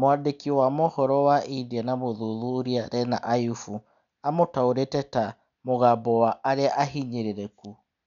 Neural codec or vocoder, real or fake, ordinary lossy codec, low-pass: none; real; none; 7.2 kHz